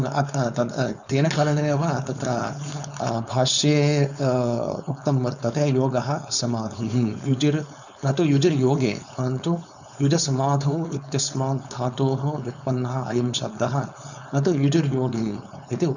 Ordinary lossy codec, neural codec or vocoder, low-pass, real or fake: none; codec, 16 kHz, 4.8 kbps, FACodec; 7.2 kHz; fake